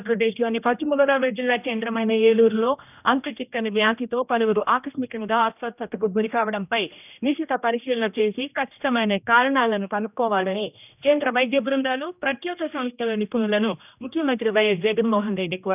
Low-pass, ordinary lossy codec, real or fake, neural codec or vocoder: 3.6 kHz; none; fake; codec, 16 kHz, 1 kbps, X-Codec, HuBERT features, trained on general audio